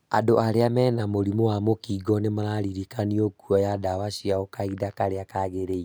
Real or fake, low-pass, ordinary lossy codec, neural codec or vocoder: real; none; none; none